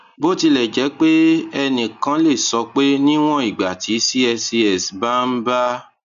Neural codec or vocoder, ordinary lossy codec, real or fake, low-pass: none; none; real; 7.2 kHz